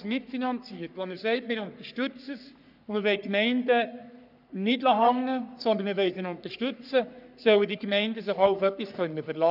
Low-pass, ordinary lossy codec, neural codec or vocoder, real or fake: 5.4 kHz; none; codec, 44.1 kHz, 3.4 kbps, Pupu-Codec; fake